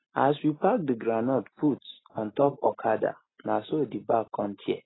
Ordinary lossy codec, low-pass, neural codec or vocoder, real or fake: AAC, 16 kbps; 7.2 kHz; none; real